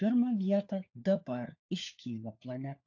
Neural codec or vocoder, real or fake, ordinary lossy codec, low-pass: codec, 16 kHz, 4 kbps, FunCodec, trained on LibriTTS, 50 frames a second; fake; AAC, 48 kbps; 7.2 kHz